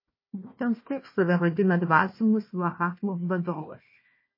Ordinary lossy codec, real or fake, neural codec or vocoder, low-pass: MP3, 24 kbps; fake; codec, 16 kHz, 1 kbps, FunCodec, trained on Chinese and English, 50 frames a second; 5.4 kHz